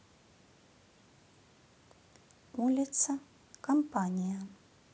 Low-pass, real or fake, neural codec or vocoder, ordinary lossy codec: none; real; none; none